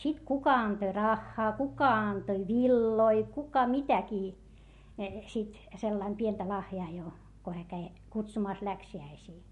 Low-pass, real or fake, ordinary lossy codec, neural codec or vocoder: 14.4 kHz; real; MP3, 48 kbps; none